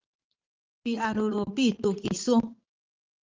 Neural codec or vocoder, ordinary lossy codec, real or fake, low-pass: vocoder, 22.05 kHz, 80 mel bands, Vocos; Opus, 32 kbps; fake; 7.2 kHz